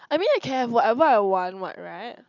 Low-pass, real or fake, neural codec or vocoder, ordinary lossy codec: 7.2 kHz; real; none; none